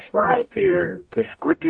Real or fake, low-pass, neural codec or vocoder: fake; 9.9 kHz; codec, 44.1 kHz, 0.9 kbps, DAC